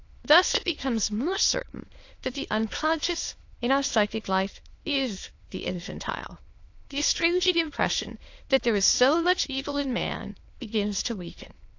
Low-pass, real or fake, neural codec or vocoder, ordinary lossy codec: 7.2 kHz; fake; autoencoder, 22.05 kHz, a latent of 192 numbers a frame, VITS, trained on many speakers; AAC, 48 kbps